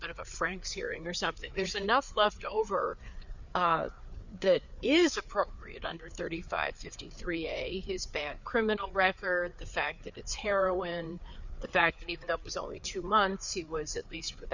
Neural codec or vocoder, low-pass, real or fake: codec, 16 kHz, 4 kbps, FreqCodec, larger model; 7.2 kHz; fake